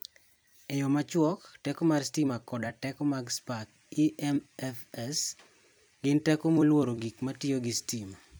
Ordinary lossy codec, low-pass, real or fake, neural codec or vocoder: none; none; fake; vocoder, 44.1 kHz, 128 mel bands every 256 samples, BigVGAN v2